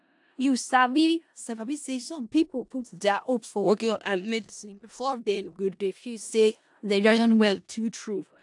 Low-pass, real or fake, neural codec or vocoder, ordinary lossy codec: 10.8 kHz; fake; codec, 16 kHz in and 24 kHz out, 0.4 kbps, LongCat-Audio-Codec, four codebook decoder; AAC, 64 kbps